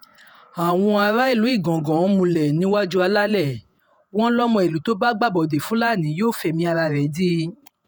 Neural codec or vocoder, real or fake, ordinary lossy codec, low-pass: vocoder, 48 kHz, 128 mel bands, Vocos; fake; none; none